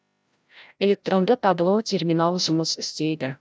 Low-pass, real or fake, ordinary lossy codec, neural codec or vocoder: none; fake; none; codec, 16 kHz, 0.5 kbps, FreqCodec, larger model